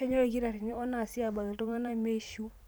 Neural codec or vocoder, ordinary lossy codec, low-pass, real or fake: vocoder, 44.1 kHz, 128 mel bands every 512 samples, BigVGAN v2; none; none; fake